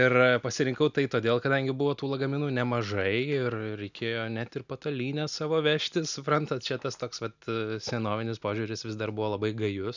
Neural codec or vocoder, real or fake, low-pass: none; real; 7.2 kHz